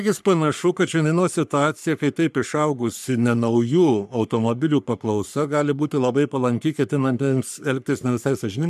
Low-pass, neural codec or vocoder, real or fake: 14.4 kHz; codec, 44.1 kHz, 3.4 kbps, Pupu-Codec; fake